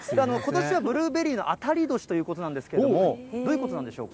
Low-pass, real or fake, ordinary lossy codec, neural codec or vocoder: none; real; none; none